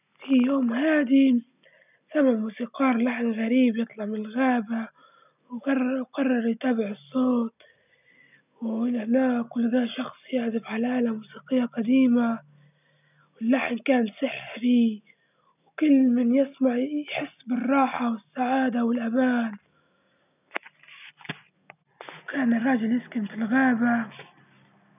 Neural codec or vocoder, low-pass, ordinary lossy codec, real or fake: none; 3.6 kHz; none; real